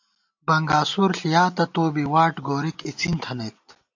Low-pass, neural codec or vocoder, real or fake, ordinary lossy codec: 7.2 kHz; none; real; AAC, 48 kbps